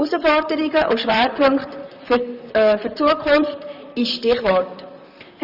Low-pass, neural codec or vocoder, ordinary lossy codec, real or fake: 5.4 kHz; vocoder, 44.1 kHz, 128 mel bands, Pupu-Vocoder; none; fake